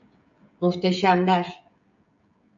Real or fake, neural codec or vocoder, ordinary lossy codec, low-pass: fake; codec, 16 kHz, 16 kbps, FreqCodec, smaller model; AAC, 64 kbps; 7.2 kHz